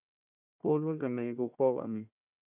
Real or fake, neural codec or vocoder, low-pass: fake; codec, 16 kHz, 1 kbps, FunCodec, trained on Chinese and English, 50 frames a second; 3.6 kHz